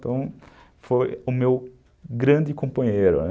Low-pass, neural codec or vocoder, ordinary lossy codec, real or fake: none; none; none; real